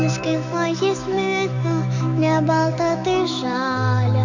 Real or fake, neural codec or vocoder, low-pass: fake; autoencoder, 48 kHz, 128 numbers a frame, DAC-VAE, trained on Japanese speech; 7.2 kHz